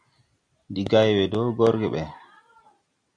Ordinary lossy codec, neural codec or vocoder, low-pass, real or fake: AAC, 64 kbps; none; 9.9 kHz; real